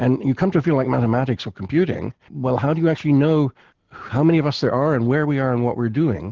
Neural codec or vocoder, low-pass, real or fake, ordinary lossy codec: none; 7.2 kHz; real; Opus, 16 kbps